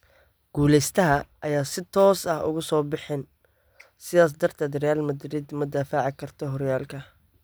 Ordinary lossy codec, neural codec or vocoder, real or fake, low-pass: none; none; real; none